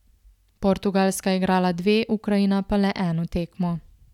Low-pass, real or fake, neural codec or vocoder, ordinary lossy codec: 19.8 kHz; real; none; none